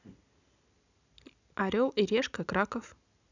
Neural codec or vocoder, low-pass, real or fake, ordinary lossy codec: none; 7.2 kHz; real; none